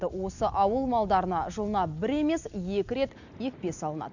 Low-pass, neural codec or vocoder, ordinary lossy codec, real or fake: 7.2 kHz; none; none; real